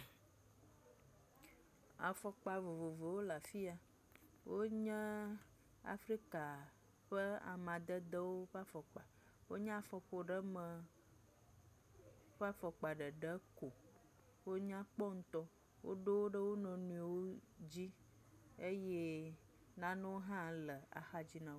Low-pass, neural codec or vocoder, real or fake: 14.4 kHz; none; real